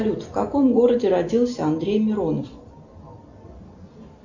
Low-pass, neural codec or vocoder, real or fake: 7.2 kHz; none; real